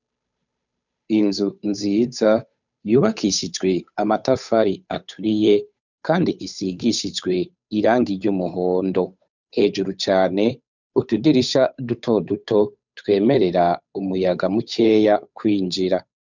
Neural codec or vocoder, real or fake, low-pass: codec, 16 kHz, 8 kbps, FunCodec, trained on Chinese and English, 25 frames a second; fake; 7.2 kHz